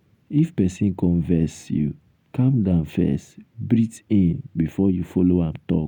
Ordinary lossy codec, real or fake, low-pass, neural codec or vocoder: none; real; 19.8 kHz; none